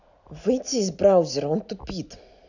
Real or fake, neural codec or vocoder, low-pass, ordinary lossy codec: real; none; 7.2 kHz; none